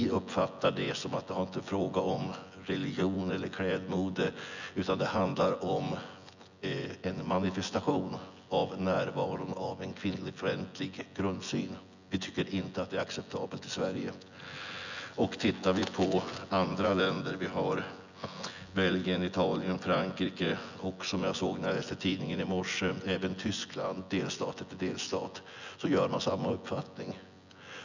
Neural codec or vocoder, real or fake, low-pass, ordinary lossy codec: vocoder, 24 kHz, 100 mel bands, Vocos; fake; 7.2 kHz; none